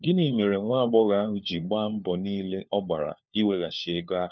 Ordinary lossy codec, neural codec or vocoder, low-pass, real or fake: none; codec, 16 kHz, 4 kbps, FunCodec, trained on LibriTTS, 50 frames a second; none; fake